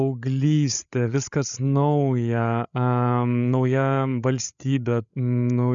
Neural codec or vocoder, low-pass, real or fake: codec, 16 kHz, 8 kbps, FreqCodec, larger model; 7.2 kHz; fake